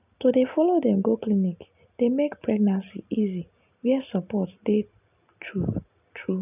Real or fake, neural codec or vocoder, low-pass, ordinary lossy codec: real; none; 3.6 kHz; none